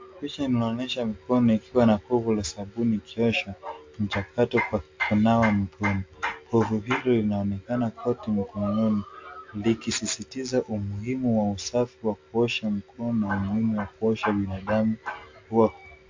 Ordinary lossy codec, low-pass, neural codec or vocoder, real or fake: MP3, 48 kbps; 7.2 kHz; none; real